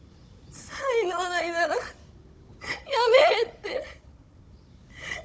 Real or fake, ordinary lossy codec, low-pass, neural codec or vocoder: fake; none; none; codec, 16 kHz, 16 kbps, FunCodec, trained on Chinese and English, 50 frames a second